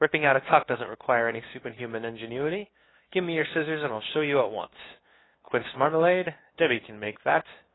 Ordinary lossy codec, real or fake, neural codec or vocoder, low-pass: AAC, 16 kbps; fake; codec, 16 kHz, 2 kbps, FunCodec, trained on LibriTTS, 25 frames a second; 7.2 kHz